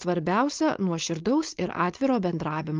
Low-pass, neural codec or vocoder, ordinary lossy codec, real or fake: 7.2 kHz; codec, 16 kHz, 4.8 kbps, FACodec; Opus, 32 kbps; fake